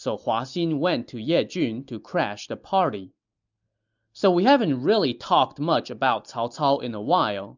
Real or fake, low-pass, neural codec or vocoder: real; 7.2 kHz; none